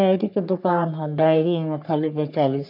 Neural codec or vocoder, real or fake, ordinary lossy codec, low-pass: codec, 44.1 kHz, 3.4 kbps, Pupu-Codec; fake; none; 5.4 kHz